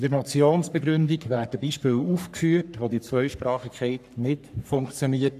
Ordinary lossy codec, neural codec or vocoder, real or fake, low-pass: none; codec, 44.1 kHz, 3.4 kbps, Pupu-Codec; fake; 14.4 kHz